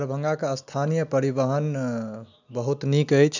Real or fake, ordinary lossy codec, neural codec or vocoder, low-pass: real; none; none; 7.2 kHz